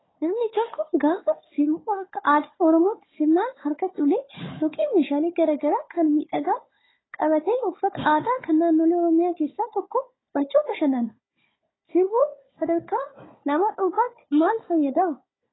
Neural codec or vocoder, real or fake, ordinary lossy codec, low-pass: codec, 16 kHz, 4 kbps, FunCodec, trained on Chinese and English, 50 frames a second; fake; AAC, 16 kbps; 7.2 kHz